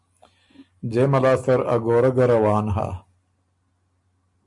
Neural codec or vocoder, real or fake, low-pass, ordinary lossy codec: none; real; 10.8 kHz; MP3, 64 kbps